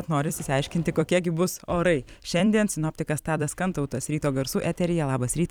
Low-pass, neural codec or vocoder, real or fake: 19.8 kHz; vocoder, 44.1 kHz, 128 mel bands every 256 samples, BigVGAN v2; fake